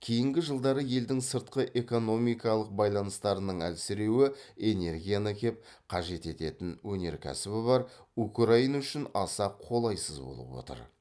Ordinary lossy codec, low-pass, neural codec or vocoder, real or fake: none; none; none; real